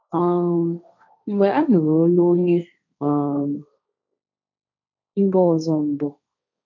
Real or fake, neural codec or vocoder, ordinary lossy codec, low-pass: fake; codec, 16 kHz, 1.1 kbps, Voila-Tokenizer; none; 7.2 kHz